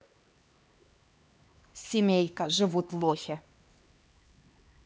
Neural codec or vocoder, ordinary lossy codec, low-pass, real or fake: codec, 16 kHz, 2 kbps, X-Codec, HuBERT features, trained on LibriSpeech; none; none; fake